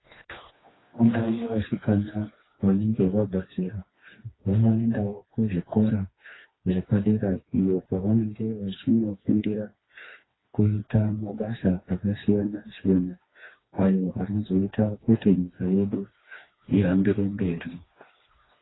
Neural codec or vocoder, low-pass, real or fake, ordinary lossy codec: codec, 16 kHz, 2 kbps, FreqCodec, smaller model; 7.2 kHz; fake; AAC, 16 kbps